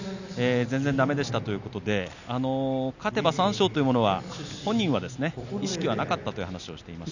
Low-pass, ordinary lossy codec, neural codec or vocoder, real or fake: 7.2 kHz; none; none; real